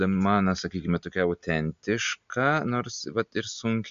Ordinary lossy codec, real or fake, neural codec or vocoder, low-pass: MP3, 64 kbps; real; none; 7.2 kHz